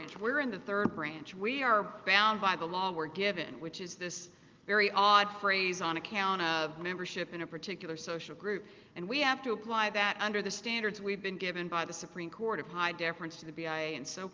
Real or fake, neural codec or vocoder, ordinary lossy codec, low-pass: real; none; Opus, 32 kbps; 7.2 kHz